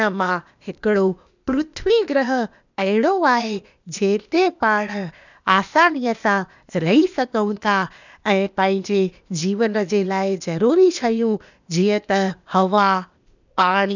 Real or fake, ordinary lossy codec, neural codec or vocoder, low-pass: fake; none; codec, 16 kHz, 0.8 kbps, ZipCodec; 7.2 kHz